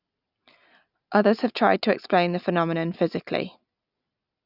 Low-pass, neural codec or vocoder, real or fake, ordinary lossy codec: 5.4 kHz; none; real; none